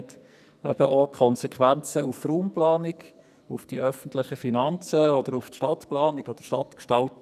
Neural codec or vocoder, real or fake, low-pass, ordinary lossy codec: codec, 44.1 kHz, 2.6 kbps, SNAC; fake; 14.4 kHz; none